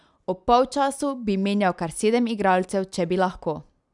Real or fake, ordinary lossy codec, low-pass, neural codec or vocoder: real; none; 10.8 kHz; none